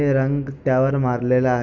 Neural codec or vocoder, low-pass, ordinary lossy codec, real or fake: none; 7.2 kHz; none; real